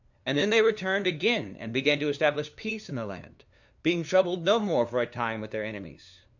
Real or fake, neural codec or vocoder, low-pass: fake; codec, 16 kHz, 2 kbps, FunCodec, trained on LibriTTS, 25 frames a second; 7.2 kHz